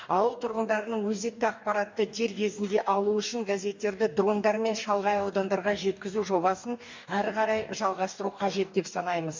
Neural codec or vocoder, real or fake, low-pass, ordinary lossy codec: codec, 44.1 kHz, 2.6 kbps, DAC; fake; 7.2 kHz; MP3, 64 kbps